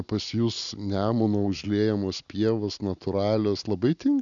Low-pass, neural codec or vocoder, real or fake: 7.2 kHz; none; real